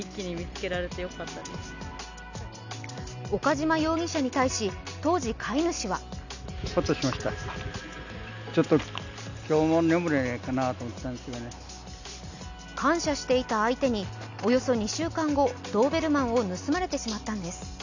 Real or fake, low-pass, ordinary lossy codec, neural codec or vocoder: real; 7.2 kHz; none; none